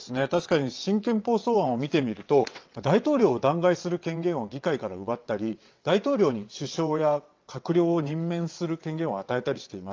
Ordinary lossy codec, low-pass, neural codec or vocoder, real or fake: Opus, 24 kbps; 7.2 kHz; vocoder, 22.05 kHz, 80 mel bands, WaveNeXt; fake